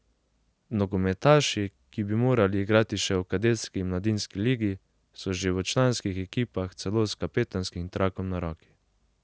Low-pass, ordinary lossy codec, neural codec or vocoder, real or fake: none; none; none; real